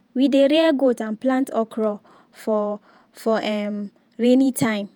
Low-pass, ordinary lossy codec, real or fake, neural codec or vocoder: 19.8 kHz; none; fake; vocoder, 48 kHz, 128 mel bands, Vocos